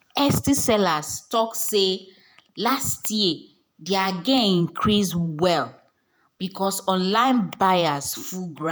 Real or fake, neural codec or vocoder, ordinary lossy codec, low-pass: fake; vocoder, 48 kHz, 128 mel bands, Vocos; none; none